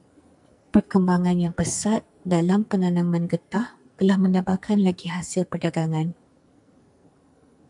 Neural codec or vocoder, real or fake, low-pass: codec, 44.1 kHz, 2.6 kbps, SNAC; fake; 10.8 kHz